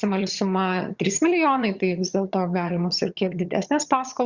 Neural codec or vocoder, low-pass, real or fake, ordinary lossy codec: vocoder, 22.05 kHz, 80 mel bands, HiFi-GAN; 7.2 kHz; fake; Opus, 64 kbps